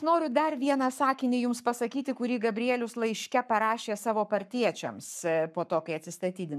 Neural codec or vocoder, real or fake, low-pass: codec, 44.1 kHz, 7.8 kbps, Pupu-Codec; fake; 14.4 kHz